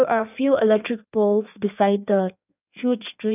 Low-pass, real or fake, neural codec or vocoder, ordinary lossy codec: 3.6 kHz; fake; codec, 16 kHz in and 24 kHz out, 1.1 kbps, FireRedTTS-2 codec; none